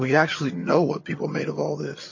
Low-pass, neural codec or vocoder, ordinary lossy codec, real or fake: 7.2 kHz; vocoder, 22.05 kHz, 80 mel bands, HiFi-GAN; MP3, 32 kbps; fake